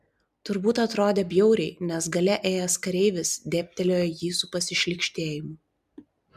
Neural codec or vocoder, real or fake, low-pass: none; real; 14.4 kHz